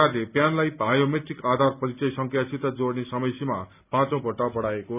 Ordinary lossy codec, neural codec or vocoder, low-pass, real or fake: none; none; 3.6 kHz; real